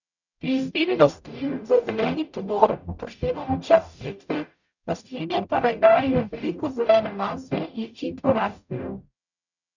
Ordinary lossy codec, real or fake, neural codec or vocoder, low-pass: none; fake; codec, 44.1 kHz, 0.9 kbps, DAC; 7.2 kHz